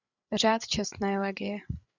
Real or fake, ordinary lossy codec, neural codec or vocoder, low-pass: fake; Opus, 64 kbps; codec, 16 kHz, 8 kbps, FreqCodec, larger model; 7.2 kHz